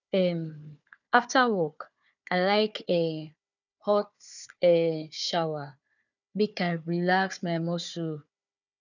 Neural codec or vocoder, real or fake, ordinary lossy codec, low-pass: codec, 16 kHz, 4 kbps, FunCodec, trained on Chinese and English, 50 frames a second; fake; none; 7.2 kHz